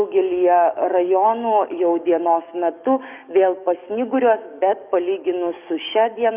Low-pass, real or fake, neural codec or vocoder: 3.6 kHz; fake; codec, 44.1 kHz, 7.8 kbps, DAC